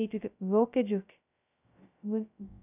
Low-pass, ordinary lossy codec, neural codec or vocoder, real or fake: 3.6 kHz; none; codec, 16 kHz, 0.2 kbps, FocalCodec; fake